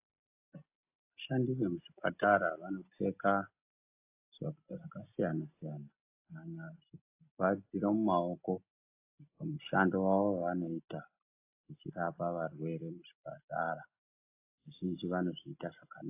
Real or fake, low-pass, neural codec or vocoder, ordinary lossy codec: real; 3.6 kHz; none; AAC, 32 kbps